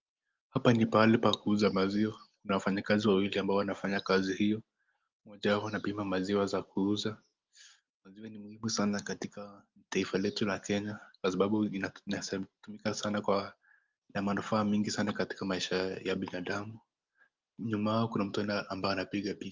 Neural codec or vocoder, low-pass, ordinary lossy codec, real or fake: none; 7.2 kHz; Opus, 32 kbps; real